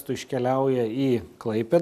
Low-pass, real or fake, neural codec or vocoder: 14.4 kHz; real; none